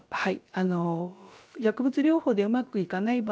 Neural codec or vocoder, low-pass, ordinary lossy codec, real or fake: codec, 16 kHz, about 1 kbps, DyCAST, with the encoder's durations; none; none; fake